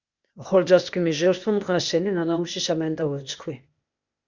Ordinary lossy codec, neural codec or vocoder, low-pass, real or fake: Opus, 64 kbps; codec, 16 kHz, 0.8 kbps, ZipCodec; 7.2 kHz; fake